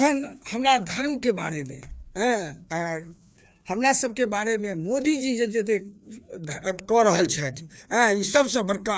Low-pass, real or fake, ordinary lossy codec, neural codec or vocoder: none; fake; none; codec, 16 kHz, 2 kbps, FreqCodec, larger model